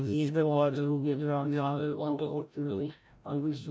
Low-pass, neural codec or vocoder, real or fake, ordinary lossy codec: none; codec, 16 kHz, 0.5 kbps, FreqCodec, larger model; fake; none